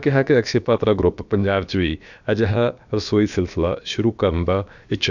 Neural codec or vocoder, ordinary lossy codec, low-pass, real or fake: codec, 16 kHz, about 1 kbps, DyCAST, with the encoder's durations; none; 7.2 kHz; fake